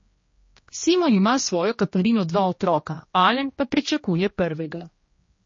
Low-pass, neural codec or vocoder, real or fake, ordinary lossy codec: 7.2 kHz; codec, 16 kHz, 1 kbps, X-Codec, HuBERT features, trained on general audio; fake; MP3, 32 kbps